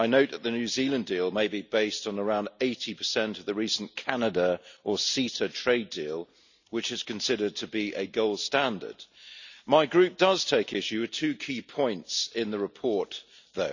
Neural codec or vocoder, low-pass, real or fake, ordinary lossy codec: none; 7.2 kHz; real; none